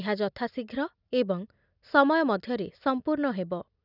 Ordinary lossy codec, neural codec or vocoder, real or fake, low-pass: none; none; real; 5.4 kHz